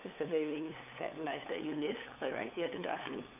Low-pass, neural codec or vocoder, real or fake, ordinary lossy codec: 3.6 kHz; codec, 16 kHz, 8 kbps, FunCodec, trained on LibriTTS, 25 frames a second; fake; none